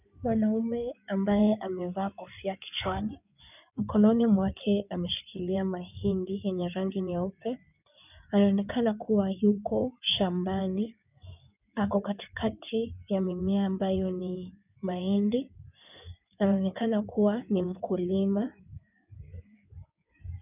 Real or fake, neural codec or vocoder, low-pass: fake; codec, 16 kHz in and 24 kHz out, 2.2 kbps, FireRedTTS-2 codec; 3.6 kHz